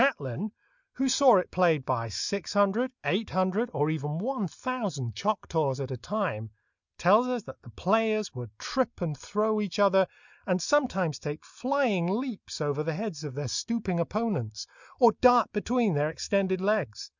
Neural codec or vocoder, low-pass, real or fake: none; 7.2 kHz; real